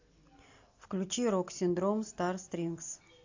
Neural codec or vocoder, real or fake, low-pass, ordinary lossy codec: none; real; 7.2 kHz; AAC, 48 kbps